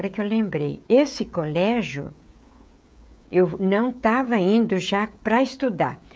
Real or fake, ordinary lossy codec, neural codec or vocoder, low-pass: fake; none; codec, 16 kHz, 16 kbps, FreqCodec, smaller model; none